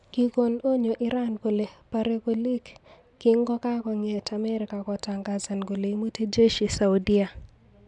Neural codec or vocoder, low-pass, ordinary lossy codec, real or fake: none; 10.8 kHz; MP3, 96 kbps; real